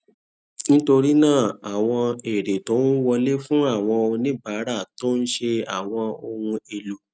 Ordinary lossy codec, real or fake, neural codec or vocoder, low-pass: none; real; none; none